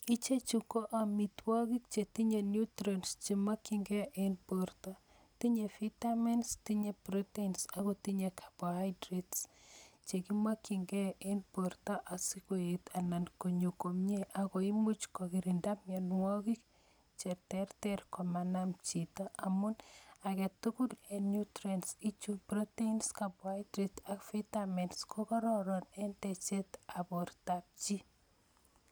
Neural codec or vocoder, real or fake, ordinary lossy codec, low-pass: none; real; none; none